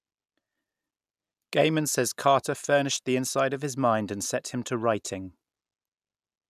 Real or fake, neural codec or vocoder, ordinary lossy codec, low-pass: real; none; none; 14.4 kHz